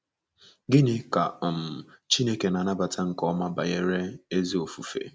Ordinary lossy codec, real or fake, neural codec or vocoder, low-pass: none; real; none; none